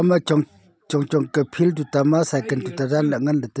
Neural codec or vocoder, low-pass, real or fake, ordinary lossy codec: none; none; real; none